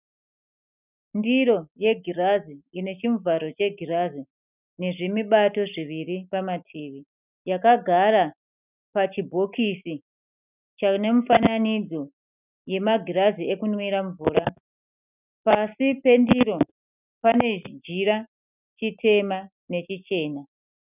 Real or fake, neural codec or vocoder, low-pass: real; none; 3.6 kHz